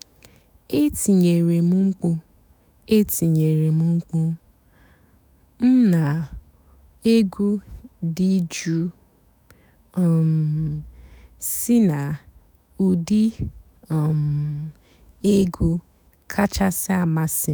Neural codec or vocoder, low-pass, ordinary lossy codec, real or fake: autoencoder, 48 kHz, 128 numbers a frame, DAC-VAE, trained on Japanese speech; none; none; fake